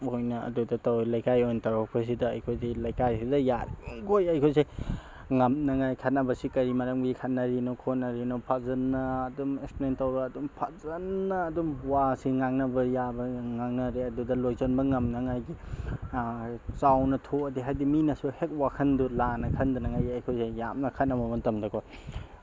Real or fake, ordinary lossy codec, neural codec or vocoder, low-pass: real; none; none; none